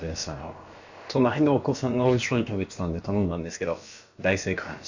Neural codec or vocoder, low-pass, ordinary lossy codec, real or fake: codec, 16 kHz, about 1 kbps, DyCAST, with the encoder's durations; 7.2 kHz; Opus, 64 kbps; fake